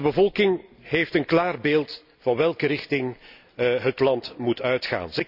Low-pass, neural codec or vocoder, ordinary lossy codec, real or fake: 5.4 kHz; none; none; real